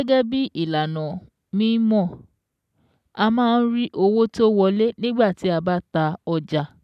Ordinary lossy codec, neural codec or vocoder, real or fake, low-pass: none; none; real; 14.4 kHz